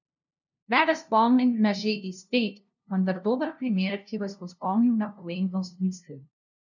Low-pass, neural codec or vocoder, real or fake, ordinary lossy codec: 7.2 kHz; codec, 16 kHz, 0.5 kbps, FunCodec, trained on LibriTTS, 25 frames a second; fake; none